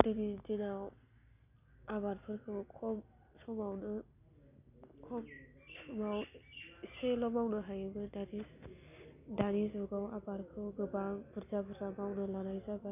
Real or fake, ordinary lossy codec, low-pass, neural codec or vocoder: real; none; 3.6 kHz; none